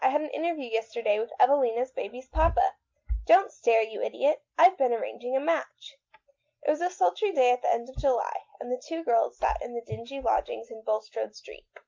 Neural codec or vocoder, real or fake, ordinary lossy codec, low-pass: none; real; Opus, 24 kbps; 7.2 kHz